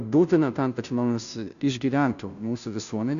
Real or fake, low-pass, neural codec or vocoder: fake; 7.2 kHz; codec, 16 kHz, 0.5 kbps, FunCodec, trained on Chinese and English, 25 frames a second